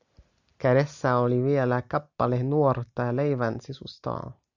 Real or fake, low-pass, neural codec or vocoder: real; 7.2 kHz; none